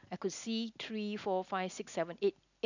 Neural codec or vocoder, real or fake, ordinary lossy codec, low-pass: none; real; none; 7.2 kHz